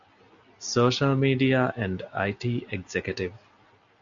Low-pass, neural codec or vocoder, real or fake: 7.2 kHz; none; real